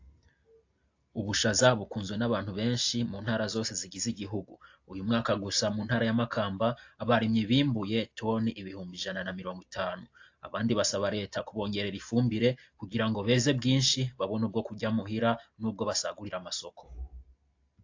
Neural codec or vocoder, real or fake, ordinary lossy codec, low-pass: none; real; AAC, 48 kbps; 7.2 kHz